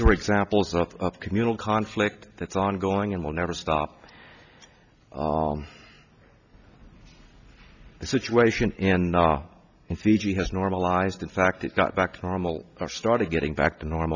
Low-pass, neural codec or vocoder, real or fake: 7.2 kHz; none; real